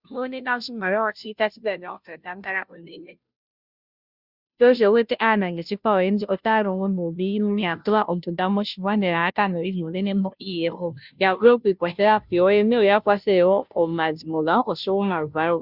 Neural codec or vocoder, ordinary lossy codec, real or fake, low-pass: codec, 16 kHz, 0.5 kbps, FunCodec, trained on Chinese and English, 25 frames a second; Opus, 64 kbps; fake; 5.4 kHz